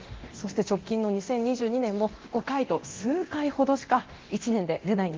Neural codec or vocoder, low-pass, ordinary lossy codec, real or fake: codec, 24 kHz, 0.9 kbps, DualCodec; 7.2 kHz; Opus, 16 kbps; fake